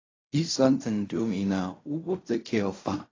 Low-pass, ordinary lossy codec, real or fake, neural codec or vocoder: 7.2 kHz; AAC, 32 kbps; fake; codec, 16 kHz in and 24 kHz out, 0.4 kbps, LongCat-Audio-Codec, fine tuned four codebook decoder